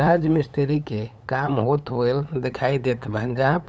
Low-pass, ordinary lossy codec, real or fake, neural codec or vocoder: none; none; fake; codec, 16 kHz, 8 kbps, FunCodec, trained on LibriTTS, 25 frames a second